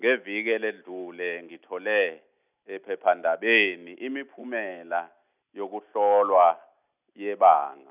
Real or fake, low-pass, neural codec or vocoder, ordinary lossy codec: real; 3.6 kHz; none; none